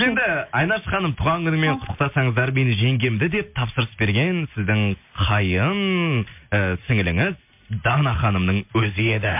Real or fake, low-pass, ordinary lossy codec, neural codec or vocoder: real; 3.6 kHz; MP3, 32 kbps; none